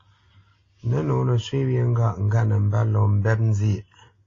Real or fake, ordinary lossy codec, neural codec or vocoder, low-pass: real; AAC, 32 kbps; none; 7.2 kHz